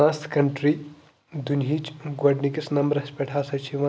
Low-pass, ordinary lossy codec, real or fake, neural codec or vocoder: none; none; real; none